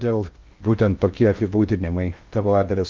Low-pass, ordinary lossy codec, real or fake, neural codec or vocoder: 7.2 kHz; Opus, 24 kbps; fake; codec, 16 kHz in and 24 kHz out, 0.8 kbps, FocalCodec, streaming, 65536 codes